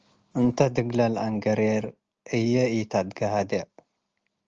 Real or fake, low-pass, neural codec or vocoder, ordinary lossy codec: real; 7.2 kHz; none; Opus, 24 kbps